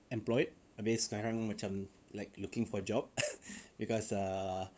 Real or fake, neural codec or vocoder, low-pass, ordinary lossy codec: fake; codec, 16 kHz, 8 kbps, FunCodec, trained on LibriTTS, 25 frames a second; none; none